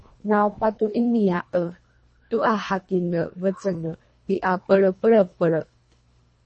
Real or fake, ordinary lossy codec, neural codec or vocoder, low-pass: fake; MP3, 32 kbps; codec, 24 kHz, 1.5 kbps, HILCodec; 10.8 kHz